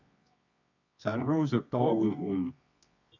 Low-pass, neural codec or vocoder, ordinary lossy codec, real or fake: 7.2 kHz; codec, 24 kHz, 0.9 kbps, WavTokenizer, medium music audio release; none; fake